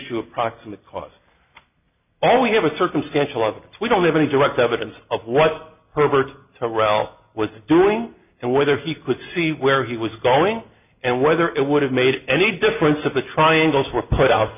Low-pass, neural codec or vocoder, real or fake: 3.6 kHz; none; real